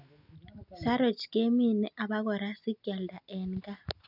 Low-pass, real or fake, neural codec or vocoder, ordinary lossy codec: 5.4 kHz; real; none; none